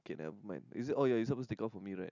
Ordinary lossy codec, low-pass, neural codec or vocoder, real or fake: none; 7.2 kHz; none; real